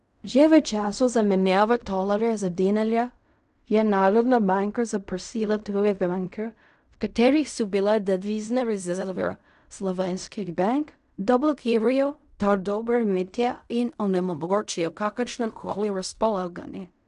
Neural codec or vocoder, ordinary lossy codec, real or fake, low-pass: codec, 16 kHz in and 24 kHz out, 0.4 kbps, LongCat-Audio-Codec, fine tuned four codebook decoder; none; fake; 10.8 kHz